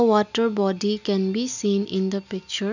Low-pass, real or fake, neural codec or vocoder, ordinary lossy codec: 7.2 kHz; real; none; none